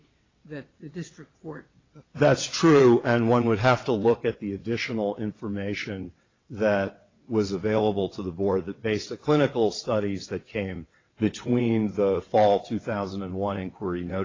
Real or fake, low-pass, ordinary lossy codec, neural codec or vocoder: fake; 7.2 kHz; AAC, 32 kbps; vocoder, 22.05 kHz, 80 mel bands, WaveNeXt